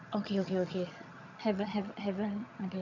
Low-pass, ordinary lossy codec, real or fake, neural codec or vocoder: 7.2 kHz; none; fake; vocoder, 22.05 kHz, 80 mel bands, HiFi-GAN